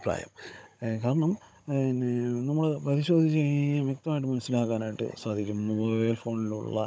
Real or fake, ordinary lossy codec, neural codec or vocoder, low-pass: fake; none; codec, 16 kHz, 16 kbps, FunCodec, trained on Chinese and English, 50 frames a second; none